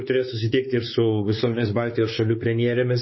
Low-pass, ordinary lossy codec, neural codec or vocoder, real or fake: 7.2 kHz; MP3, 24 kbps; codec, 16 kHz, 4 kbps, X-Codec, WavLM features, trained on Multilingual LibriSpeech; fake